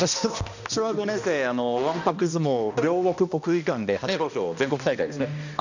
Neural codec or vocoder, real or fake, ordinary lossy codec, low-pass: codec, 16 kHz, 1 kbps, X-Codec, HuBERT features, trained on balanced general audio; fake; none; 7.2 kHz